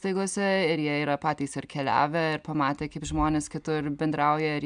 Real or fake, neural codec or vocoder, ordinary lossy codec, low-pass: real; none; MP3, 96 kbps; 9.9 kHz